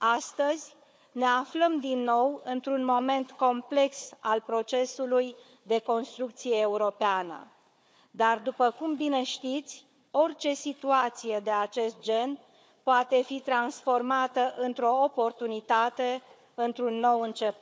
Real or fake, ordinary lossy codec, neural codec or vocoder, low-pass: fake; none; codec, 16 kHz, 4 kbps, FunCodec, trained on Chinese and English, 50 frames a second; none